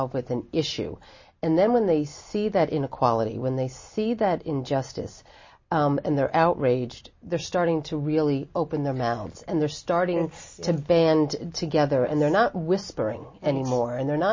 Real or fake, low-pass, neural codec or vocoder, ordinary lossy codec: real; 7.2 kHz; none; MP3, 32 kbps